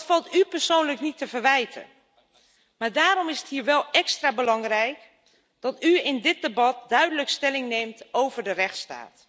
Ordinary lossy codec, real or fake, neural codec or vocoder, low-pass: none; real; none; none